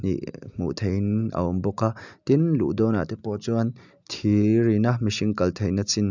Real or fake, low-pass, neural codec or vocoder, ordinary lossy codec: real; 7.2 kHz; none; none